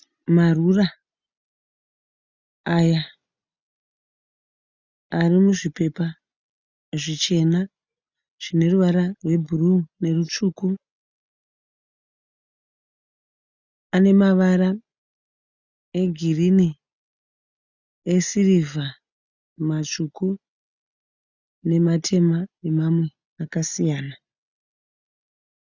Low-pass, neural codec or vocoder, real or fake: 7.2 kHz; none; real